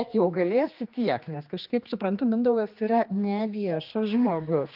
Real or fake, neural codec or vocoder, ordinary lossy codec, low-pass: fake; codec, 16 kHz, 2 kbps, X-Codec, HuBERT features, trained on general audio; Opus, 24 kbps; 5.4 kHz